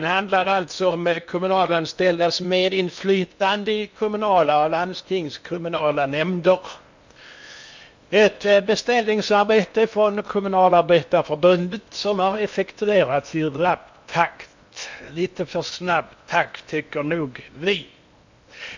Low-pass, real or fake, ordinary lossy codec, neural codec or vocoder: 7.2 kHz; fake; MP3, 64 kbps; codec, 16 kHz in and 24 kHz out, 0.8 kbps, FocalCodec, streaming, 65536 codes